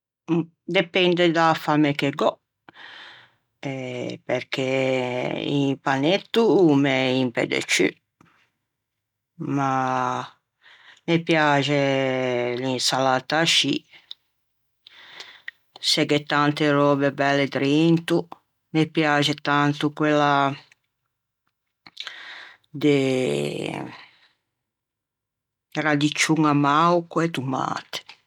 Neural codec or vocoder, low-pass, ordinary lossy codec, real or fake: none; 19.8 kHz; none; real